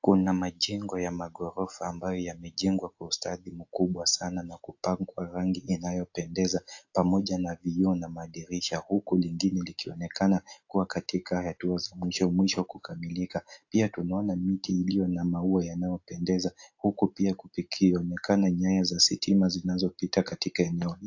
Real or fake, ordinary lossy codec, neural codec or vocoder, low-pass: real; AAC, 48 kbps; none; 7.2 kHz